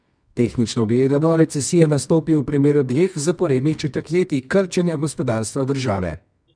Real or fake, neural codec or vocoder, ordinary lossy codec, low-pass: fake; codec, 24 kHz, 0.9 kbps, WavTokenizer, medium music audio release; none; 9.9 kHz